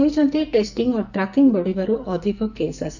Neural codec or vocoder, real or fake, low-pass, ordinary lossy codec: codec, 44.1 kHz, 2.6 kbps, SNAC; fake; 7.2 kHz; none